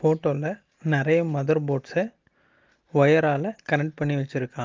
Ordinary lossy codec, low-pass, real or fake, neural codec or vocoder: Opus, 32 kbps; 7.2 kHz; real; none